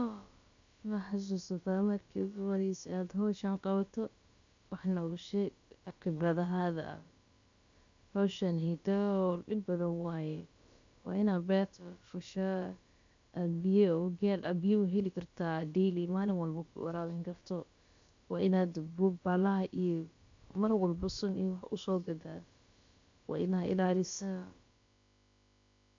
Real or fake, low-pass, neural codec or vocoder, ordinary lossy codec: fake; 7.2 kHz; codec, 16 kHz, about 1 kbps, DyCAST, with the encoder's durations; AAC, 48 kbps